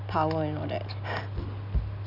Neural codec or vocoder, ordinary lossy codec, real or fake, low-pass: none; none; real; 5.4 kHz